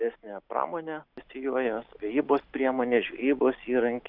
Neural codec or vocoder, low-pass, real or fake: none; 5.4 kHz; real